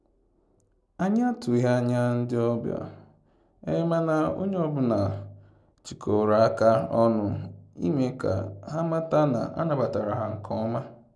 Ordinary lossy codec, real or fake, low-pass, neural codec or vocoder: none; real; none; none